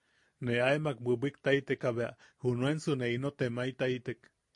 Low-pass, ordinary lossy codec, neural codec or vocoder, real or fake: 10.8 kHz; MP3, 48 kbps; none; real